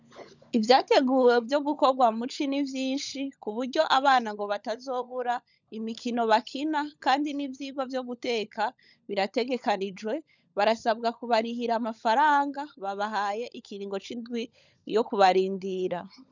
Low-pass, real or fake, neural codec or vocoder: 7.2 kHz; fake; codec, 16 kHz, 16 kbps, FunCodec, trained on LibriTTS, 50 frames a second